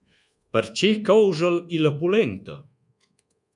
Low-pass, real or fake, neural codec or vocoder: 10.8 kHz; fake; codec, 24 kHz, 1.2 kbps, DualCodec